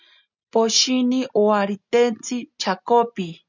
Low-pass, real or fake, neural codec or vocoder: 7.2 kHz; real; none